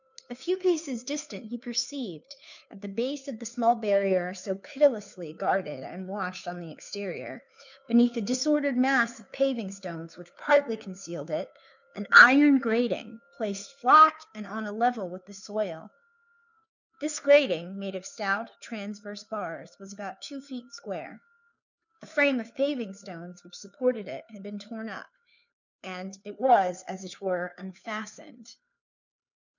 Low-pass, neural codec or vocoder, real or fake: 7.2 kHz; codec, 16 kHz, 2 kbps, FunCodec, trained on Chinese and English, 25 frames a second; fake